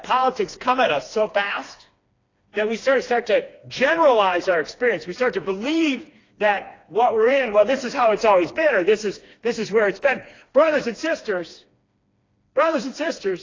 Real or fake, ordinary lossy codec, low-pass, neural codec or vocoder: fake; AAC, 32 kbps; 7.2 kHz; codec, 16 kHz, 2 kbps, FreqCodec, smaller model